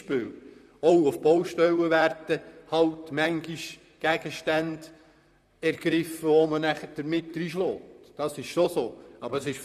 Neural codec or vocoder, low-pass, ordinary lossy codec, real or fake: vocoder, 44.1 kHz, 128 mel bands, Pupu-Vocoder; 14.4 kHz; none; fake